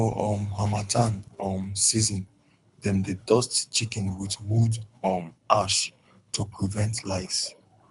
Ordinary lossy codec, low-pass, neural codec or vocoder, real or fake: none; 10.8 kHz; codec, 24 kHz, 3 kbps, HILCodec; fake